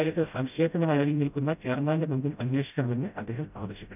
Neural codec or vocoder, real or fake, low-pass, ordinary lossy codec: codec, 16 kHz, 0.5 kbps, FreqCodec, smaller model; fake; 3.6 kHz; none